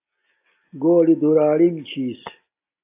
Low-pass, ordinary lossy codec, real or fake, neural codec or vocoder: 3.6 kHz; AAC, 24 kbps; real; none